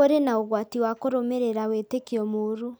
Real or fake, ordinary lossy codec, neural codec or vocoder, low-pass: real; none; none; none